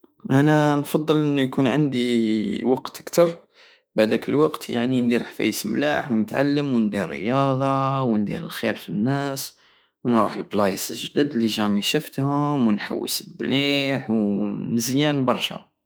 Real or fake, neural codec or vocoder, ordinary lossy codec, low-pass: fake; autoencoder, 48 kHz, 32 numbers a frame, DAC-VAE, trained on Japanese speech; none; none